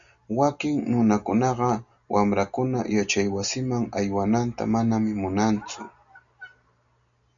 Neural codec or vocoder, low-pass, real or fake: none; 7.2 kHz; real